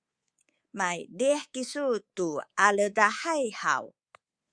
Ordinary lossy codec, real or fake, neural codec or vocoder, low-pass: Opus, 64 kbps; fake; codec, 24 kHz, 3.1 kbps, DualCodec; 9.9 kHz